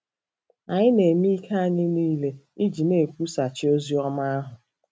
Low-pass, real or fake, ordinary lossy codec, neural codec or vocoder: none; real; none; none